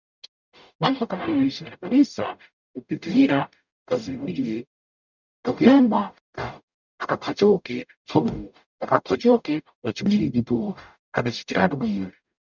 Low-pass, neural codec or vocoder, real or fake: 7.2 kHz; codec, 44.1 kHz, 0.9 kbps, DAC; fake